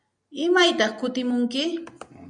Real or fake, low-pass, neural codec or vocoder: real; 9.9 kHz; none